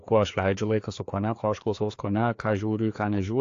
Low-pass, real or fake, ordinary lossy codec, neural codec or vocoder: 7.2 kHz; fake; AAC, 48 kbps; codec, 16 kHz, 2 kbps, FreqCodec, larger model